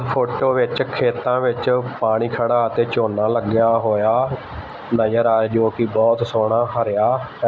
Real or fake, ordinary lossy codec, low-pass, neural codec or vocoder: real; none; none; none